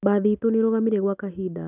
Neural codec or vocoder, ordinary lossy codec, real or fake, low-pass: none; none; real; 3.6 kHz